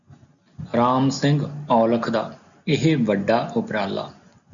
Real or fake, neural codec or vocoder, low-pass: real; none; 7.2 kHz